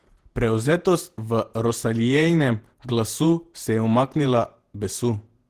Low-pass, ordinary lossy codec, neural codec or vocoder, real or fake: 14.4 kHz; Opus, 16 kbps; vocoder, 48 kHz, 128 mel bands, Vocos; fake